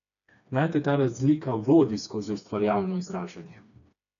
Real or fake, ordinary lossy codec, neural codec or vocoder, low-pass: fake; none; codec, 16 kHz, 2 kbps, FreqCodec, smaller model; 7.2 kHz